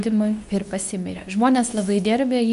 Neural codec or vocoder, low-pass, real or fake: codec, 24 kHz, 0.9 kbps, WavTokenizer, medium speech release version 2; 10.8 kHz; fake